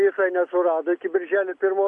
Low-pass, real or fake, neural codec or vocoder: 10.8 kHz; real; none